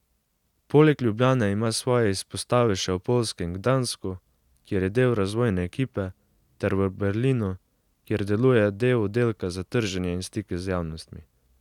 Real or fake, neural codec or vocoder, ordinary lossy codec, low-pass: real; none; none; 19.8 kHz